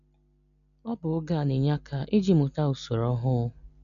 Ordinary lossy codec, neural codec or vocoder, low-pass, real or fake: none; none; 7.2 kHz; real